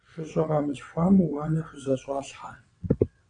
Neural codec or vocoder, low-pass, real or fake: vocoder, 22.05 kHz, 80 mel bands, WaveNeXt; 9.9 kHz; fake